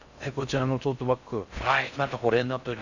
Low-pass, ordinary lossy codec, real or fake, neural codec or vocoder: 7.2 kHz; none; fake; codec, 16 kHz in and 24 kHz out, 0.6 kbps, FocalCodec, streaming, 4096 codes